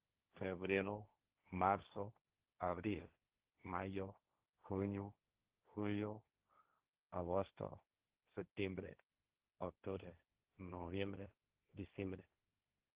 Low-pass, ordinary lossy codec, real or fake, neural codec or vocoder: 3.6 kHz; Opus, 16 kbps; fake; codec, 16 kHz, 1.1 kbps, Voila-Tokenizer